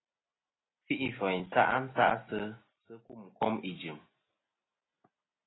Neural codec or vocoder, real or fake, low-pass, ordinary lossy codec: none; real; 7.2 kHz; AAC, 16 kbps